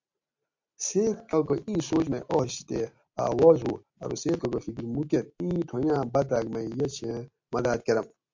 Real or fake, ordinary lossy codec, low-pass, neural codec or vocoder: real; MP3, 48 kbps; 7.2 kHz; none